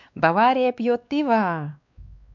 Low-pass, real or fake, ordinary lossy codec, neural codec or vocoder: 7.2 kHz; fake; none; codec, 16 kHz, 2 kbps, X-Codec, WavLM features, trained on Multilingual LibriSpeech